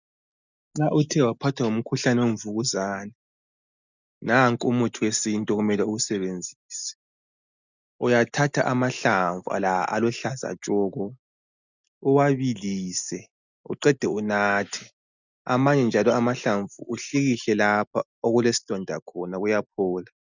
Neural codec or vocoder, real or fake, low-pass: none; real; 7.2 kHz